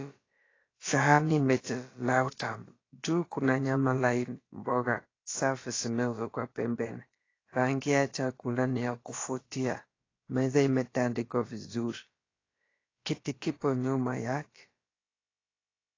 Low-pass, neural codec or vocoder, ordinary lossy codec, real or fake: 7.2 kHz; codec, 16 kHz, about 1 kbps, DyCAST, with the encoder's durations; AAC, 32 kbps; fake